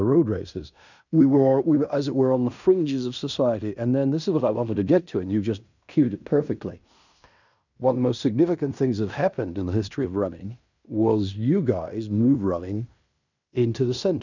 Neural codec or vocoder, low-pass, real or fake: codec, 16 kHz in and 24 kHz out, 0.9 kbps, LongCat-Audio-Codec, fine tuned four codebook decoder; 7.2 kHz; fake